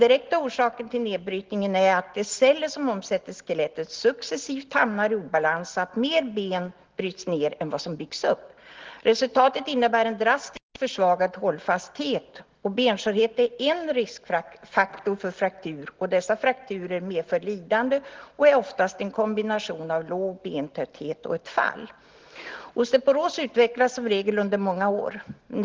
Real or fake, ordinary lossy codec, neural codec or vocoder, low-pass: real; Opus, 16 kbps; none; 7.2 kHz